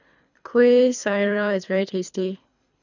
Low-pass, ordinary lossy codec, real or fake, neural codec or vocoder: 7.2 kHz; none; fake; codec, 24 kHz, 3 kbps, HILCodec